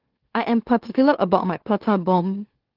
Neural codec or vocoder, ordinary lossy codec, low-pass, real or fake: autoencoder, 44.1 kHz, a latent of 192 numbers a frame, MeloTTS; Opus, 16 kbps; 5.4 kHz; fake